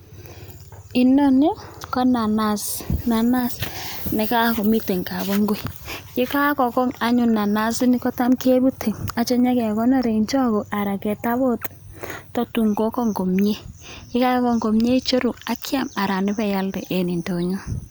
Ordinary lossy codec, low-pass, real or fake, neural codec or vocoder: none; none; real; none